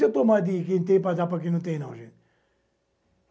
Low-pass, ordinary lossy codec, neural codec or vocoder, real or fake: none; none; none; real